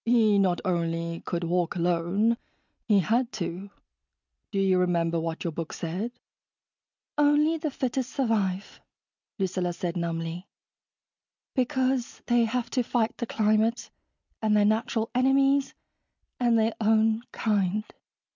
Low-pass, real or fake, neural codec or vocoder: 7.2 kHz; real; none